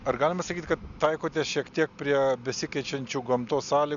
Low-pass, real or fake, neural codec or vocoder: 7.2 kHz; real; none